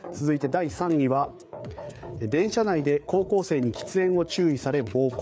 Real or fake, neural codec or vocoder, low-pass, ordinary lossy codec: fake; codec, 16 kHz, 4 kbps, FreqCodec, larger model; none; none